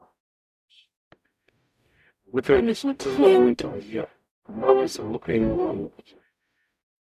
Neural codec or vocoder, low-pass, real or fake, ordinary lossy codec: codec, 44.1 kHz, 0.9 kbps, DAC; 14.4 kHz; fake; none